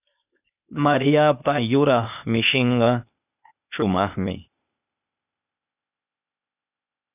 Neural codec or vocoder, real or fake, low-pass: codec, 16 kHz, 0.8 kbps, ZipCodec; fake; 3.6 kHz